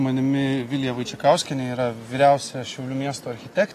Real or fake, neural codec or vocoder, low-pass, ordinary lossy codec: real; none; 14.4 kHz; AAC, 48 kbps